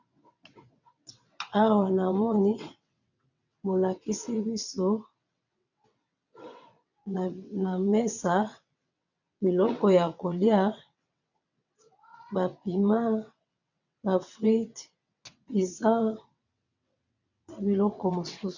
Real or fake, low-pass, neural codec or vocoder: fake; 7.2 kHz; vocoder, 22.05 kHz, 80 mel bands, WaveNeXt